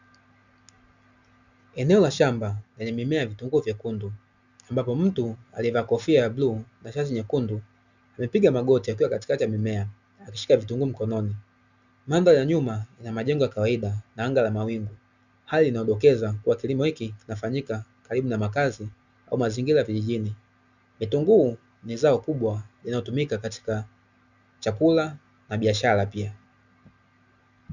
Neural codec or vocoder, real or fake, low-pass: none; real; 7.2 kHz